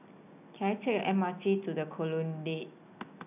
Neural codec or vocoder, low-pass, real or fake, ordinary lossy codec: none; 3.6 kHz; real; none